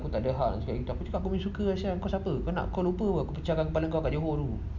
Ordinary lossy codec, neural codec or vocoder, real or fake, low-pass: none; none; real; 7.2 kHz